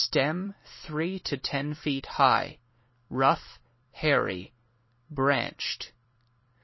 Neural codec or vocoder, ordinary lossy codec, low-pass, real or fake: vocoder, 44.1 kHz, 128 mel bands every 256 samples, BigVGAN v2; MP3, 24 kbps; 7.2 kHz; fake